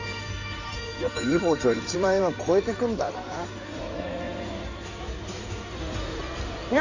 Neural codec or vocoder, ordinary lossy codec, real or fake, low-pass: codec, 16 kHz in and 24 kHz out, 2.2 kbps, FireRedTTS-2 codec; none; fake; 7.2 kHz